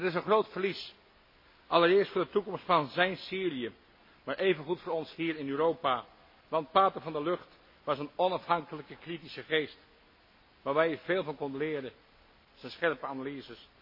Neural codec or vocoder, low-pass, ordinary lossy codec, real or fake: none; 5.4 kHz; MP3, 24 kbps; real